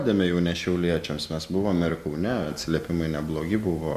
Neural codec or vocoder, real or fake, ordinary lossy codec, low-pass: none; real; Opus, 64 kbps; 14.4 kHz